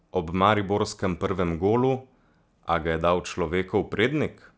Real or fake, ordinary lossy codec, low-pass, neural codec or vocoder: real; none; none; none